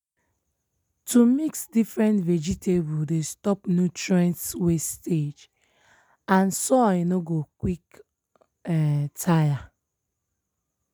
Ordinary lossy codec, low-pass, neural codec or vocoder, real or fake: none; none; none; real